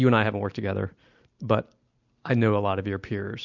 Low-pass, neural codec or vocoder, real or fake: 7.2 kHz; none; real